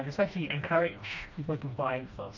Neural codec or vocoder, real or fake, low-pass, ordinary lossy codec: codec, 16 kHz, 1 kbps, FreqCodec, smaller model; fake; 7.2 kHz; none